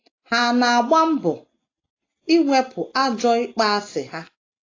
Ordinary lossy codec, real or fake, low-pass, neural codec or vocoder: AAC, 32 kbps; real; 7.2 kHz; none